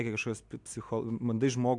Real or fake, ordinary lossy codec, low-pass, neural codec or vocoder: real; MP3, 64 kbps; 10.8 kHz; none